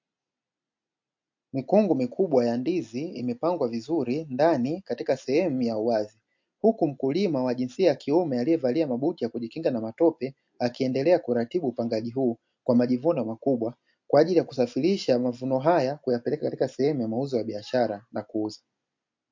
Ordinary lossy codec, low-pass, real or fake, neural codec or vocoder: MP3, 48 kbps; 7.2 kHz; real; none